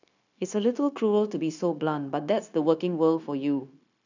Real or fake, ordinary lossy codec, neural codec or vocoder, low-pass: fake; none; codec, 16 kHz, 0.9 kbps, LongCat-Audio-Codec; 7.2 kHz